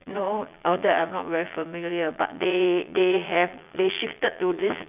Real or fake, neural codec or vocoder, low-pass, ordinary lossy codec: fake; vocoder, 22.05 kHz, 80 mel bands, Vocos; 3.6 kHz; none